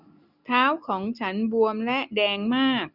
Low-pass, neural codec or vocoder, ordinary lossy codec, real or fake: 5.4 kHz; none; none; real